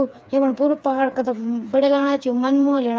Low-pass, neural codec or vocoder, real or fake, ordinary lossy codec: none; codec, 16 kHz, 4 kbps, FreqCodec, smaller model; fake; none